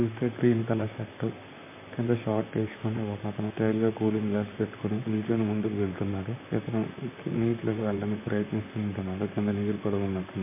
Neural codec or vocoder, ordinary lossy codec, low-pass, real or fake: vocoder, 44.1 kHz, 128 mel bands, Pupu-Vocoder; MP3, 24 kbps; 3.6 kHz; fake